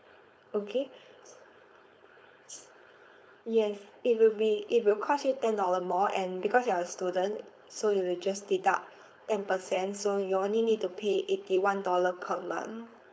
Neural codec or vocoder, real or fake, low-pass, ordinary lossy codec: codec, 16 kHz, 4.8 kbps, FACodec; fake; none; none